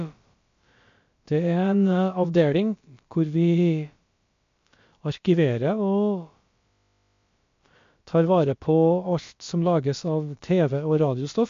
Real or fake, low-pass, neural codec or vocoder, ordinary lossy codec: fake; 7.2 kHz; codec, 16 kHz, about 1 kbps, DyCAST, with the encoder's durations; MP3, 48 kbps